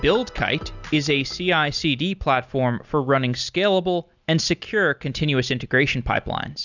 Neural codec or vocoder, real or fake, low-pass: none; real; 7.2 kHz